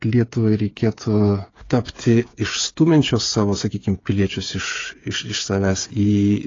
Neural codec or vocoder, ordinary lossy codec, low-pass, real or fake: codec, 16 kHz, 8 kbps, FreqCodec, smaller model; AAC, 32 kbps; 7.2 kHz; fake